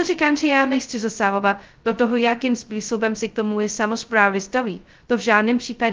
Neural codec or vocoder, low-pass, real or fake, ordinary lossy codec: codec, 16 kHz, 0.2 kbps, FocalCodec; 7.2 kHz; fake; Opus, 24 kbps